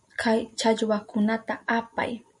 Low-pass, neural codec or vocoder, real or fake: 10.8 kHz; none; real